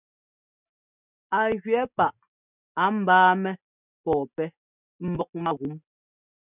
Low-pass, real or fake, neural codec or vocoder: 3.6 kHz; real; none